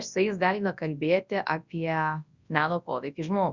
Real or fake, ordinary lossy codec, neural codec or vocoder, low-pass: fake; Opus, 64 kbps; codec, 24 kHz, 0.9 kbps, WavTokenizer, large speech release; 7.2 kHz